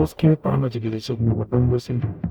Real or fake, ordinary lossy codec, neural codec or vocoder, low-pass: fake; none; codec, 44.1 kHz, 0.9 kbps, DAC; 19.8 kHz